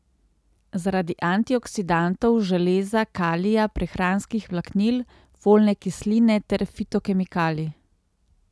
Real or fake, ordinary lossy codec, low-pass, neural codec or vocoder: real; none; none; none